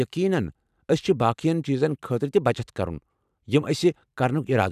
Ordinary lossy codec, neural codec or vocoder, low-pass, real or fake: none; vocoder, 44.1 kHz, 128 mel bands every 512 samples, BigVGAN v2; 14.4 kHz; fake